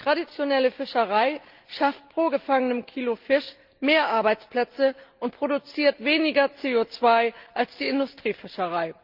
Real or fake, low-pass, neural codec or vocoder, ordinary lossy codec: real; 5.4 kHz; none; Opus, 32 kbps